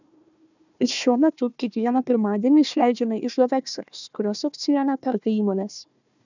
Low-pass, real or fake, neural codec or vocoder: 7.2 kHz; fake; codec, 16 kHz, 1 kbps, FunCodec, trained on Chinese and English, 50 frames a second